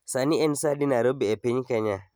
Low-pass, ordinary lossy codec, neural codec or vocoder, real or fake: none; none; none; real